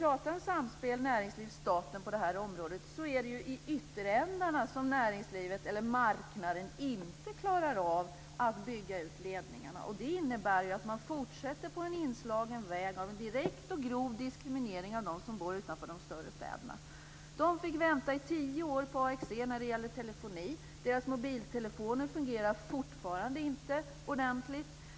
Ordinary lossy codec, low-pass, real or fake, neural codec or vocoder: none; none; real; none